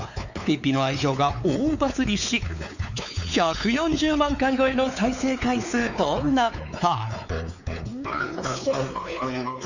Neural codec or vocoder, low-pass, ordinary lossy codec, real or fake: codec, 16 kHz, 4 kbps, X-Codec, WavLM features, trained on Multilingual LibriSpeech; 7.2 kHz; none; fake